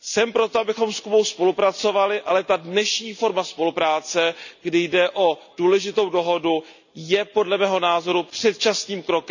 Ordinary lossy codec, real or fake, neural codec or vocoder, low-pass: none; real; none; 7.2 kHz